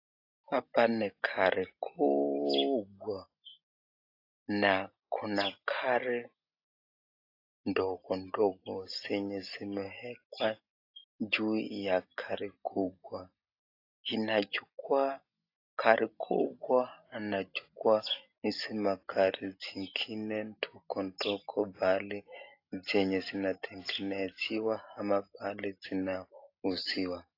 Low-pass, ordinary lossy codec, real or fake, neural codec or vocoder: 5.4 kHz; AAC, 32 kbps; real; none